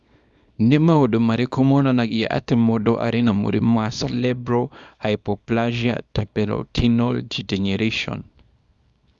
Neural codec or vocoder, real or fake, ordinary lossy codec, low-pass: codec, 24 kHz, 0.9 kbps, WavTokenizer, small release; fake; none; none